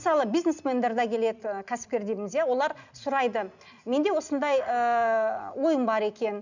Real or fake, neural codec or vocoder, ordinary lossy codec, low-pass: real; none; none; 7.2 kHz